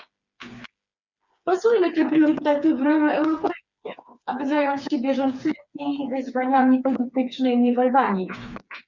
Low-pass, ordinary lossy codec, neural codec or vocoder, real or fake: 7.2 kHz; Opus, 64 kbps; codec, 16 kHz, 4 kbps, FreqCodec, smaller model; fake